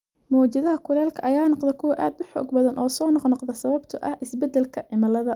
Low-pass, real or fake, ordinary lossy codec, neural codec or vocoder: 19.8 kHz; real; Opus, 32 kbps; none